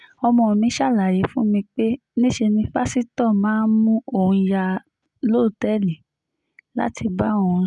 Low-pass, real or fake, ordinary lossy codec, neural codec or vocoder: 10.8 kHz; real; none; none